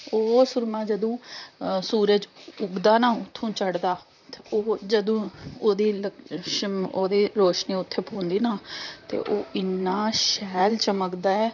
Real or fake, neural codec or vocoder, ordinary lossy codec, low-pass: fake; vocoder, 44.1 kHz, 128 mel bands, Pupu-Vocoder; none; 7.2 kHz